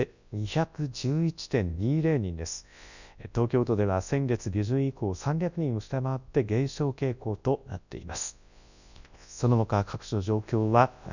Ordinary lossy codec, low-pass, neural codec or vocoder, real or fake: none; 7.2 kHz; codec, 24 kHz, 0.9 kbps, WavTokenizer, large speech release; fake